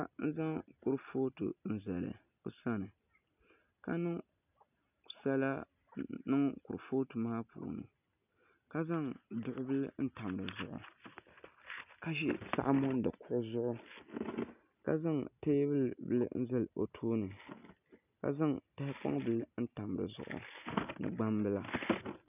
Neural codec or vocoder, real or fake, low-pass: none; real; 3.6 kHz